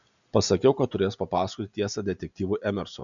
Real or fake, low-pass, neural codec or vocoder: real; 7.2 kHz; none